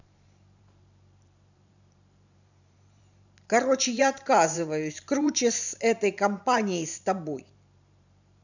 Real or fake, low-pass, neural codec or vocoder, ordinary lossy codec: fake; 7.2 kHz; vocoder, 44.1 kHz, 128 mel bands every 512 samples, BigVGAN v2; none